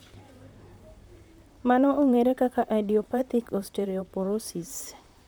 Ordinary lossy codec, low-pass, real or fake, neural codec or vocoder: none; none; fake; vocoder, 44.1 kHz, 128 mel bands, Pupu-Vocoder